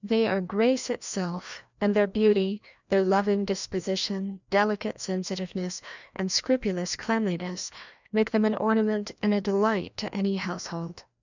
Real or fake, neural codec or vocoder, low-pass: fake; codec, 16 kHz, 1 kbps, FreqCodec, larger model; 7.2 kHz